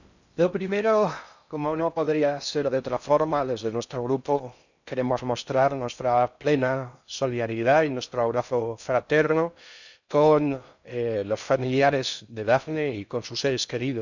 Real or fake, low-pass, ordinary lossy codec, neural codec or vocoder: fake; 7.2 kHz; none; codec, 16 kHz in and 24 kHz out, 0.6 kbps, FocalCodec, streaming, 4096 codes